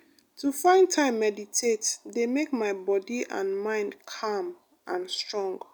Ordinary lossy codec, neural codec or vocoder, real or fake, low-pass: none; none; real; none